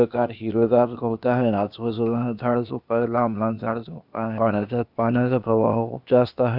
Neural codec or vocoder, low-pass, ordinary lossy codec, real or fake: codec, 16 kHz, 0.8 kbps, ZipCodec; 5.4 kHz; none; fake